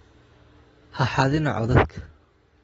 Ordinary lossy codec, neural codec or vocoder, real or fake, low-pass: AAC, 24 kbps; none; real; 10.8 kHz